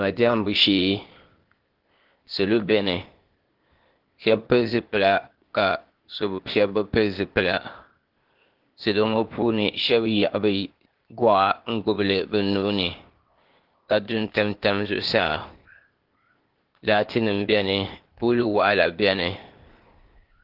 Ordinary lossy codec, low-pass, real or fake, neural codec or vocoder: Opus, 16 kbps; 5.4 kHz; fake; codec, 16 kHz, 0.8 kbps, ZipCodec